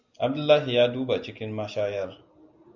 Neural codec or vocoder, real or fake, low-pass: none; real; 7.2 kHz